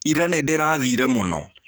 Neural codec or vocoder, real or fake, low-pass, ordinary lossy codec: codec, 44.1 kHz, 2.6 kbps, SNAC; fake; none; none